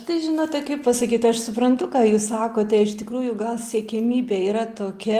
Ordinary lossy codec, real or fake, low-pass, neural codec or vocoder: Opus, 32 kbps; real; 14.4 kHz; none